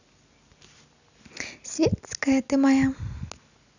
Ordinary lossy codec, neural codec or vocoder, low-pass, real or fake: none; none; 7.2 kHz; real